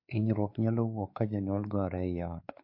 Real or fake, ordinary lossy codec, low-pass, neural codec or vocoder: fake; MP3, 32 kbps; 5.4 kHz; codec, 16 kHz, 4 kbps, X-Codec, WavLM features, trained on Multilingual LibriSpeech